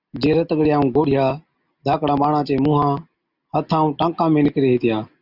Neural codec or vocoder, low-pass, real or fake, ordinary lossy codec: none; 5.4 kHz; real; Opus, 64 kbps